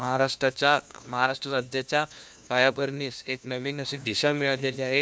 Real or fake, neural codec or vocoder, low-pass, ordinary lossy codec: fake; codec, 16 kHz, 1 kbps, FunCodec, trained on LibriTTS, 50 frames a second; none; none